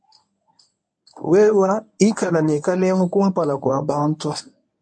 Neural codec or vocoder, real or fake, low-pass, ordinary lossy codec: codec, 24 kHz, 0.9 kbps, WavTokenizer, medium speech release version 1; fake; 9.9 kHz; MP3, 48 kbps